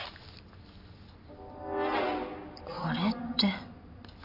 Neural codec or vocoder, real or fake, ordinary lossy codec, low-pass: none; real; none; 5.4 kHz